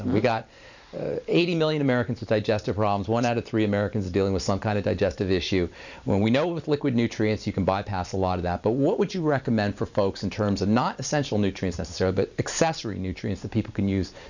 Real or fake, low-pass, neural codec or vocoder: fake; 7.2 kHz; vocoder, 44.1 kHz, 128 mel bands every 512 samples, BigVGAN v2